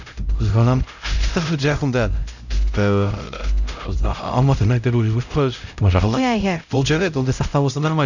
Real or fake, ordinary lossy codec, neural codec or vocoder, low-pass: fake; none; codec, 16 kHz, 0.5 kbps, X-Codec, HuBERT features, trained on LibriSpeech; 7.2 kHz